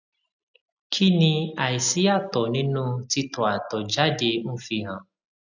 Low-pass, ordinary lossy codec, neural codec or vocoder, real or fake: 7.2 kHz; none; none; real